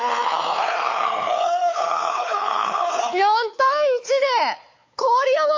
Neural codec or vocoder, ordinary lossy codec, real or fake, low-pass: codec, 16 kHz, 4 kbps, X-Codec, WavLM features, trained on Multilingual LibriSpeech; AAC, 48 kbps; fake; 7.2 kHz